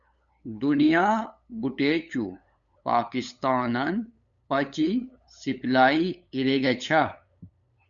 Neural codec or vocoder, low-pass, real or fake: codec, 16 kHz, 8 kbps, FunCodec, trained on LibriTTS, 25 frames a second; 7.2 kHz; fake